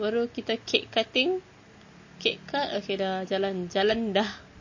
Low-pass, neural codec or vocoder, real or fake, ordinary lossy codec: 7.2 kHz; none; real; MP3, 32 kbps